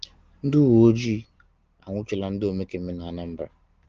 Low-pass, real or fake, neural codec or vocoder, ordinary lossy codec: 7.2 kHz; real; none; Opus, 16 kbps